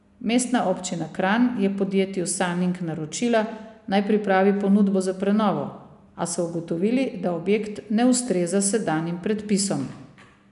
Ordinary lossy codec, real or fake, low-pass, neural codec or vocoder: none; real; 10.8 kHz; none